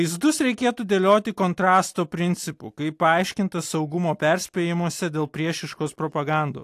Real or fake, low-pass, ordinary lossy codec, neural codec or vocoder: real; 14.4 kHz; AAC, 64 kbps; none